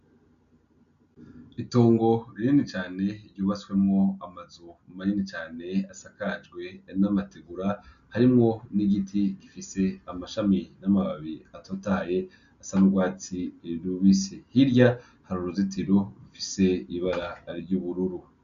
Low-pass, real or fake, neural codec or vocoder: 7.2 kHz; real; none